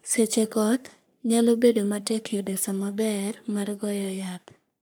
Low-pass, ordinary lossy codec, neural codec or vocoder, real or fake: none; none; codec, 44.1 kHz, 3.4 kbps, Pupu-Codec; fake